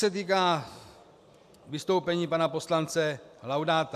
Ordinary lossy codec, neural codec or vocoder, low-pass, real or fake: AAC, 96 kbps; none; 14.4 kHz; real